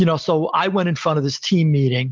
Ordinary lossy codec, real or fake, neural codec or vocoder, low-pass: Opus, 32 kbps; real; none; 7.2 kHz